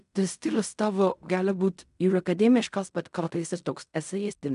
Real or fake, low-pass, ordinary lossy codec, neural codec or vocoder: fake; 10.8 kHz; MP3, 96 kbps; codec, 16 kHz in and 24 kHz out, 0.4 kbps, LongCat-Audio-Codec, fine tuned four codebook decoder